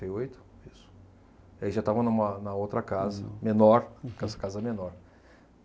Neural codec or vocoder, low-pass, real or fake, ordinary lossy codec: none; none; real; none